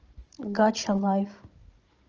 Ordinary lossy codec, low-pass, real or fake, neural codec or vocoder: Opus, 24 kbps; 7.2 kHz; fake; codec, 16 kHz, 16 kbps, FunCodec, trained on Chinese and English, 50 frames a second